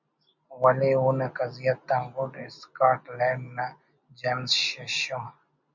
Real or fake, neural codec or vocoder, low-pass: real; none; 7.2 kHz